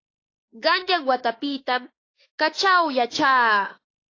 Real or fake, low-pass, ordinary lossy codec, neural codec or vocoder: fake; 7.2 kHz; AAC, 32 kbps; autoencoder, 48 kHz, 32 numbers a frame, DAC-VAE, trained on Japanese speech